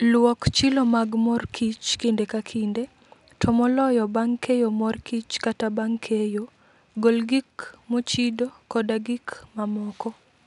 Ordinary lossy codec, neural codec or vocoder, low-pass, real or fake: none; none; 10.8 kHz; real